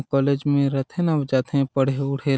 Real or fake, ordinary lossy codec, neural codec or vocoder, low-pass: real; none; none; none